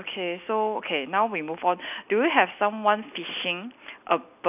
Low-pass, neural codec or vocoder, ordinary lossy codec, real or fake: 3.6 kHz; none; none; real